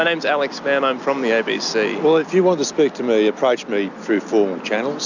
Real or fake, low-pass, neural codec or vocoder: real; 7.2 kHz; none